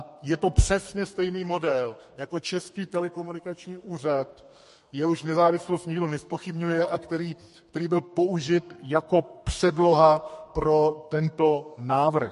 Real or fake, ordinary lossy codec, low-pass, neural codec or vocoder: fake; MP3, 48 kbps; 14.4 kHz; codec, 32 kHz, 1.9 kbps, SNAC